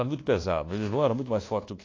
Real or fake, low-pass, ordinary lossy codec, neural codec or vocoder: fake; 7.2 kHz; AAC, 32 kbps; codec, 24 kHz, 1.2 kbps, DualCodec